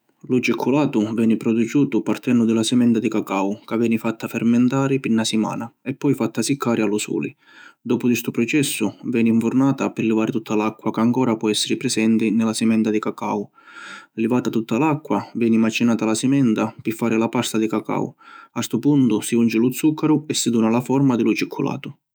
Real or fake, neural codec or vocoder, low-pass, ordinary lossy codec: fake; autoencoder, 48 kHz, 128 numbers a frame, DAC-VAE, trained on Japanese speech; none; none